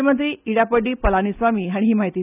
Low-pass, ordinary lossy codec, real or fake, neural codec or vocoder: 3.6 kHz; none; real; none